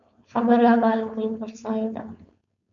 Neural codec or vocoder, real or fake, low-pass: codec, 16 kHz, 4.8 kbps, FACodec; fake; 7.2 kHz